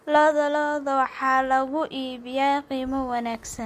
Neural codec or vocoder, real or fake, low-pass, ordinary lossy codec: none; real; 14.4 kHz; MP3, 64 kbps